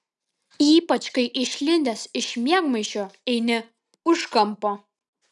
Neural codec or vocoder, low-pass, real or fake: none; 10.8 kHz; real